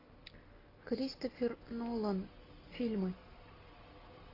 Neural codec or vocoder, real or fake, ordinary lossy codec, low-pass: none; real; AAC, 24 kbps; 5.4 kHz